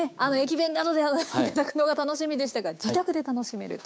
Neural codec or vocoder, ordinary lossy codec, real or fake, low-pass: codec, 16 kHz, 6 kbps, DAC; none; fake; none